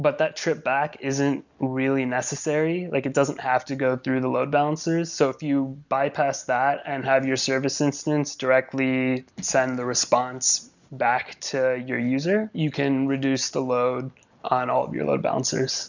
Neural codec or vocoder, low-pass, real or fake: none; 7.2 kHz; real